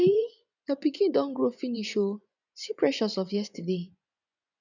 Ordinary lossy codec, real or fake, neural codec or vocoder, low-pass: none; fake; vocoder, 24 kHz, 100 mel bands, Vocos; 7.2 kHz